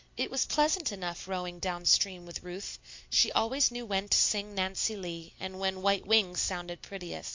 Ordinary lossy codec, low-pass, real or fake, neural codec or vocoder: MP3, 48 kbps; 7.2 kHz; real; none